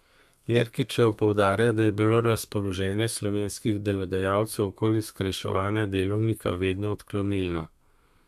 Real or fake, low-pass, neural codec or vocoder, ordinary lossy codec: fake; 14.4 kHz; codec, 32 kHz, 1.9 kbps, SNAC; none